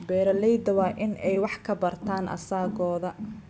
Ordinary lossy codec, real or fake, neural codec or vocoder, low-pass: none; real; none; none